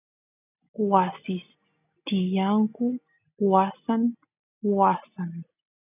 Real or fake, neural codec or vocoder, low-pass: real; none; 3.6 kHz